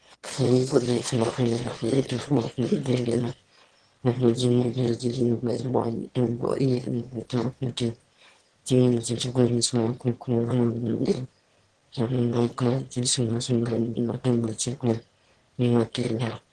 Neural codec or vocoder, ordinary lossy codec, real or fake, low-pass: autoencoder, 22.05 kHz, a latent of 192 numbers a frame, VITS, trained on one speaker; Opus, 16 kbps; fake; 9.9 kHz